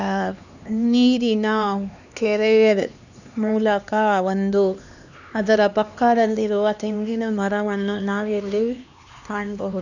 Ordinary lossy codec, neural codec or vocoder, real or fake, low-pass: none; codec, 16 kHz, 2 kbps, X-Codec, HuBERT features, trained on LibriSpeech; fake; 7.2 kHz